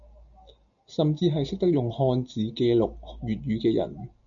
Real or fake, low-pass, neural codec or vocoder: real; 7.2 kHz; none